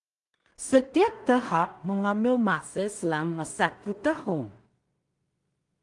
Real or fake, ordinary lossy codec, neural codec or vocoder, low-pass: fake; Opus, 32 kbps; codec, 16 kHz in and 24 kHz out, 0.4 kbps, LongCat-Audio-Codec, two codebook decoder; 10.8 kHz